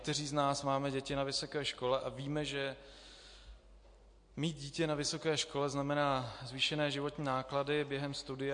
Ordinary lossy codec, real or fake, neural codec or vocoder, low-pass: MP3, 48 kbps; real; none; 9.9 kHz